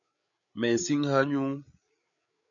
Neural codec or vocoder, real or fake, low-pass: codec, 16 kHz, 8 kbps, FreqCodec, larger model; fake; 7.2 kHz